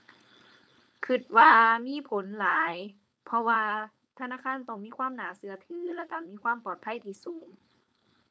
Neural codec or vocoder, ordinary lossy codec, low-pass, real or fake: codec, 16 kHz, 4.8 kbps, FACodec; none; none; fake